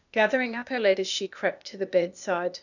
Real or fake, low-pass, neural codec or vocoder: fake; 7.2 kHz; codec, 16 kHz, 0.8 kbps, ZipCodec